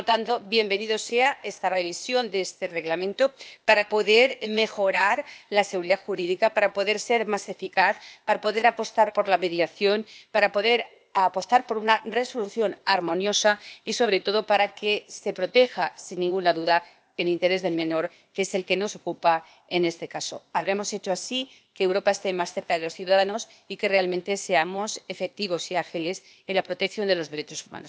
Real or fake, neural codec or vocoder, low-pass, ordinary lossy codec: fake; codec, 16 kHz, 0.8 kbps, ZipCodec; none; none